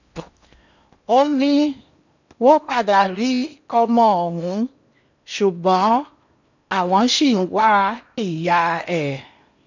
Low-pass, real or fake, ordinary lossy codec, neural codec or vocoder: 7.2 kHz; fake; none; codec, 16 kHz in and 24 kHz out, 0.8 kbps, FocalCodec, streaming, 65536 codes